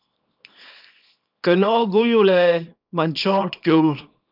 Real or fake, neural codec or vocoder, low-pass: fake; codec, 24 kHz, 0.9 kbps, WavTokenizer, small release; 5.4 kHz